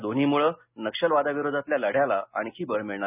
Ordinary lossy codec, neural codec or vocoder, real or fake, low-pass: none; none; real; 3.6 kHz